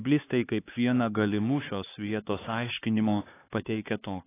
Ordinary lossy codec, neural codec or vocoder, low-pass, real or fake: AAC, 16 kbps; codec, 16 kHz, 4 kbps, X-Codec, HuBERT features, trained on LibriSpeech; 3.6 kHz; fake